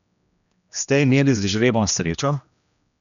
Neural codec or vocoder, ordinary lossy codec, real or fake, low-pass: codec, 16 kHz, 1 kbps, X-Codec, HuBERT features, trained on general audio; none; fake; 7.2 kHz